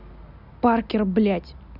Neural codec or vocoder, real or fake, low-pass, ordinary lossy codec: none; real; 5.4 kHz; none